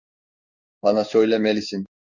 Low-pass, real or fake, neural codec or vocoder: 7.2 kHz; fake; codec, 16 kHz in and 24 kHz out, 1 kbps, XY-Tokenizer